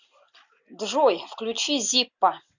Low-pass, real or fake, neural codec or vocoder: 7.2 kHz; real; none